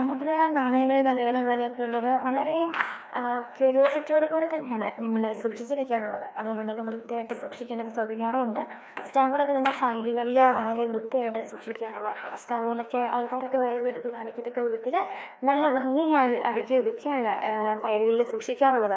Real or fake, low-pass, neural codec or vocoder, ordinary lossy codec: fake; none; codec, 16 kHz, 1 kbps, FreqCodec, larger model; none